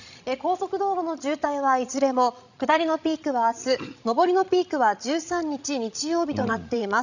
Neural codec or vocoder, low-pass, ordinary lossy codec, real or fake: codec, 16 kHz, 16 kbps, FreqCodec, larger model; 7.2 kHz; none; fake